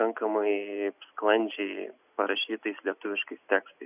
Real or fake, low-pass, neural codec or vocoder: real; 3.6 kHz; none